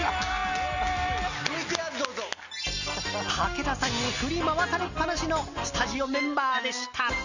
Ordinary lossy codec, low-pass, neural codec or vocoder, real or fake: none; 7.2 kHz; none; real